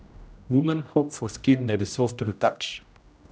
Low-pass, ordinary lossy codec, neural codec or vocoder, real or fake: none; none; codec, 16 kHz, 0.5 kbps, X-Codec, HuBERT features, trained on general audio; fake